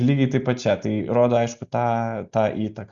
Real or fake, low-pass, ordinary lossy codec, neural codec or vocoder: real; 7.2 kHz; Opus, 64 kbps; none